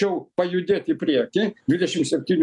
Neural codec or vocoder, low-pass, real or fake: none; 10.8 kHz; real